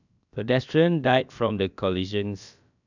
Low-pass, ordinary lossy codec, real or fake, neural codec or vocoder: 7.2 kHz; none; fake; codec, 16 kHz, about 1 kbps, DyCAST, with the encoder's durations